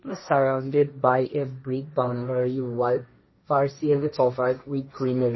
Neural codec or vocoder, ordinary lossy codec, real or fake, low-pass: codec, 16 kHz, 1.1 kbps, Voila-Tokenizer; MP3, 24 kbps; fake; 7.2 kHz